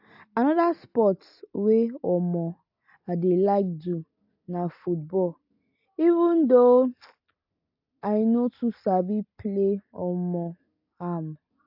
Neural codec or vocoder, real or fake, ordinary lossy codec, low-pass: none; real; AAC, 48 kbps; 5.4 kHz